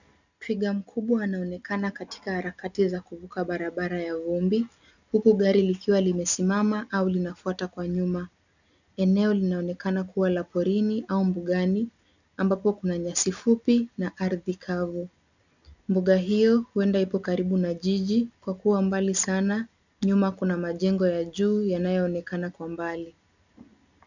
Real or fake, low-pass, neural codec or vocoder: real; 7.2 kHz; none